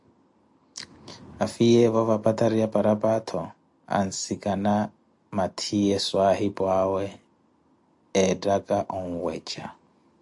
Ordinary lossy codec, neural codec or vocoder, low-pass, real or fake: AAC, 64 kbps; none; 10.8 kHz; real